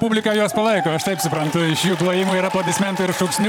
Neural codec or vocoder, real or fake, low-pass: vocoder, 44.1 kHz, 128 mel bands, Pupu-Vocoder; fake; 19.8 kHz